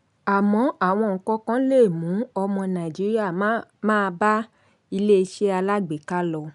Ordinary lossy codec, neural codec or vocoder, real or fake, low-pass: none; none; real; 10.8 kHz